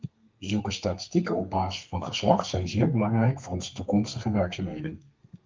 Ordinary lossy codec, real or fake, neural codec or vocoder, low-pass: Opus, 32 kbps; fake; codec, 32 kHz, 1.9 kbps, SNAC; 7.2 kHz